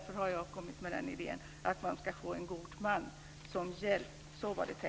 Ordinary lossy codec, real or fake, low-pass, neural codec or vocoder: none; real; none; none